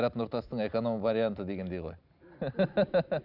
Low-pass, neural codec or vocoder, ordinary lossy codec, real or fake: 5.4 kHz; none; none; real